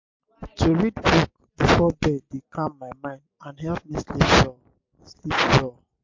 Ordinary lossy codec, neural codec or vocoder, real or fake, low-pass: MP3, 48 kbps; none; real; 7.2 kHz